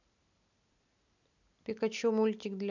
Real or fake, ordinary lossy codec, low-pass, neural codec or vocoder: real; none; 7.2 kHz; none